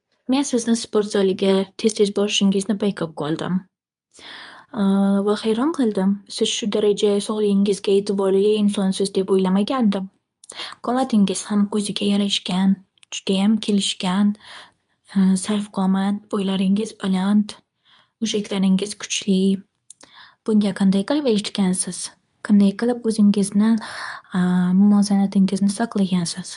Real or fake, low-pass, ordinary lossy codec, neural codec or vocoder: fake; 10.8 kHz; none; codec, 24 kHz, 0.9 kbps, WavTokenizer, medium speech release version 2